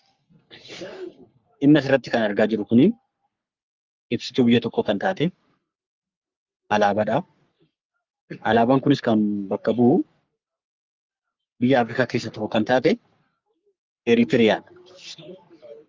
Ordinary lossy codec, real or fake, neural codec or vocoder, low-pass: Opus, 32 kbps; fake; codec, 44.1 kHz, 3.4 kbps, Pupu-Codec; 7.2 kHz